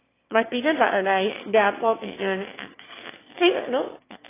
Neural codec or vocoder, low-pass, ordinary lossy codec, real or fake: autoencoder, 22.05 kHz, a latent of 192 numbers a frame, VITS, trained on one speaker; 3.6 kHz; AAC, 16 kbps; fake